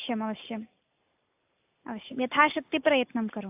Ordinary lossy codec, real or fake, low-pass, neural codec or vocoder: AAC, 24 kbps; real; 3.6 kHz; none